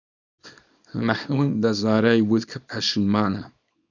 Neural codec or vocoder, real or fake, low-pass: codec, 24 kHz, 0.9 kbps, WavTokenizer, small release; fake; 7.2 kHz